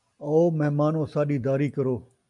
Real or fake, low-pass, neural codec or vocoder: real; 10.8 kHz; none